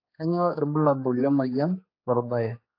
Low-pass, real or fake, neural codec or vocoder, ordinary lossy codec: 5.4 kHz; fake; codec, 16 kHz, 2 kbps, X-Codec, HuBERT features, trained on general audio; AAC, 32 kbps